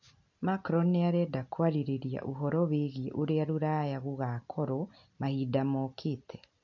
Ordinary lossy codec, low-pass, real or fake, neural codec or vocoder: MP3, 48 kbps; 7.2 kHz; real; none